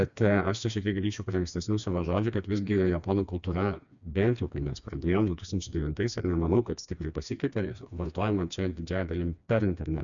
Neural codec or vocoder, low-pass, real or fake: codec, 16 kHz, 2 kbps, FreqCodec, smaller model; 7.2 kHz; fake